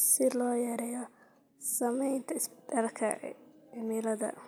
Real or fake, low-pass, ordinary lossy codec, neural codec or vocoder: real; none; none; none